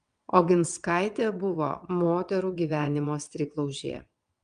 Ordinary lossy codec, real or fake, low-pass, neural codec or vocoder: Opus, 32 kbps; fake; 9.9 kHz; vocoder, 22.05 kHz, 80 mel bands, WaveNeXt